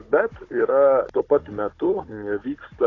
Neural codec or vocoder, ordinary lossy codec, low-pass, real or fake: codec, 16 kHz, 16 kbps, FunCodec, trained on Chinese and English, 50 frames a second; AAC, 32 kbps; 7.2 kHz; fake